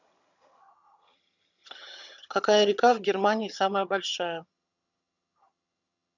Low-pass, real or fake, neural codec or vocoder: 7.2 kHz; fake; vocoder, 22.05 kHz, 80 mel bands, HiFi-GAN